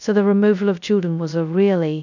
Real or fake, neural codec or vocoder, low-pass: fake; codec, 16 kHz, 0.2 kbps, FocalCodec; 7.2 kHz